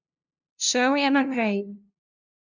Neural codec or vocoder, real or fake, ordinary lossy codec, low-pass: codec, 16 kHz, 0.5 kbps, FunCodec, trained on LibriTTS, 25 frames a second; fake; none; 7.2 kHz